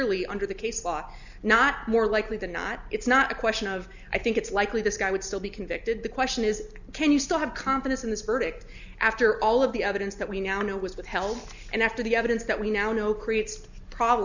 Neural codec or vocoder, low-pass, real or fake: none; 7.2 kHz; real